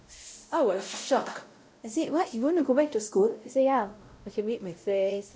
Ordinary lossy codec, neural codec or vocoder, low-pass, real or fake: none; codec, 16 kHz, 0.5 kbps, X-Codec, WavLM features, trained on Multilingual LibriSpeech; none; fake